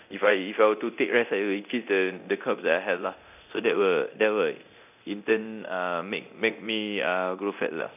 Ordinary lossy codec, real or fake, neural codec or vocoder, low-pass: none; fake; codec, 24 kHz, 0.9 kbps, DualCodec; 3.6 kHz